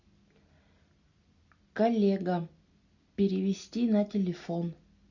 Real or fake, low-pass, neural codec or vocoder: real; 7.2 kHz; none